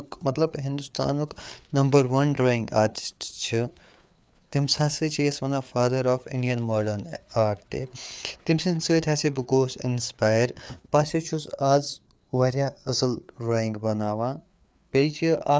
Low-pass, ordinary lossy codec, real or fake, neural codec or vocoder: none; none; fake; codec, 16 kHz, 4 kbps, FreqCodec, larger model